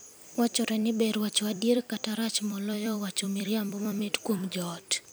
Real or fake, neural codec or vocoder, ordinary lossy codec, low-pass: fake; vocoder, 44.1 kHz, 128 mel bands every 256 samples, BigVGAN v2; none; none